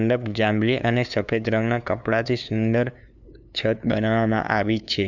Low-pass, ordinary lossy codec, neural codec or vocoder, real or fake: 7.2 kHz; none; codec, 16 kHz, 2 kbps, FunCodec, trained on LibriTTS, 25 frames a second; fake